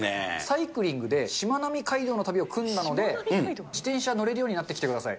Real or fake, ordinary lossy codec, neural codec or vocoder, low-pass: real; none; none; none